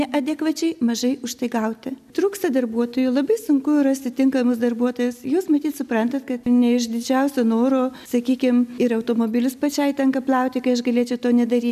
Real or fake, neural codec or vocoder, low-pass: real; none; 14.4 kHz